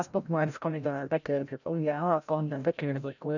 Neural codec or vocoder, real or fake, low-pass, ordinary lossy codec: codec, 16 kHz, 0.5 kbps, FreqCodec, larger model; fake; 7.2 kHz; none